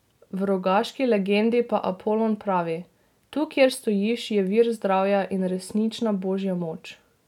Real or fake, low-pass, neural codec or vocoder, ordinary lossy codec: real; 19.8 kHz; none; none